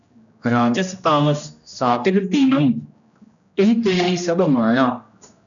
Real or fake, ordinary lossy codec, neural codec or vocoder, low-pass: fake; MP3, 96 kbps; codec, 16 kHz, 1 kbps, X-Codec, HuBERT features, trained on general audio; 7.2 kHz